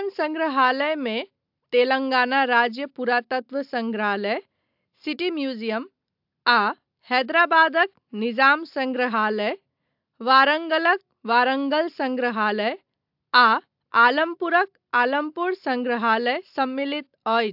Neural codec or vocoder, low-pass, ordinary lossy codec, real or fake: none; 5.4 kHz; AAC, 48 kbps; real